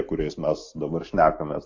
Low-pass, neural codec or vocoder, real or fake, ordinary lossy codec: 7.2 kHz; none; real; AAC, 48 kbps